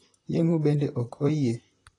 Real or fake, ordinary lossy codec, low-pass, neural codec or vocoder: fake; AAC, 32 kbps; 10.8 kHz; vocoder, 44.1 kHz, 128 mel bands, Pupu-Vocoder